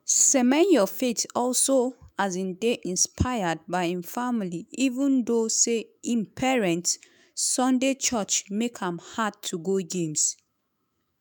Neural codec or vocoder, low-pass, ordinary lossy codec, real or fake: autoencoder, 48 kHz, 128 numbers a frame, DAC-VAE, trained on Japanese speech; none; none; fake